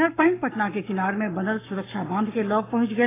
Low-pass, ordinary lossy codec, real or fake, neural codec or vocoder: 3.6 kHz; AAC, 16 kbps; fake; codec, 44.1 kHz, 7.8 kbps, Pupu-Codec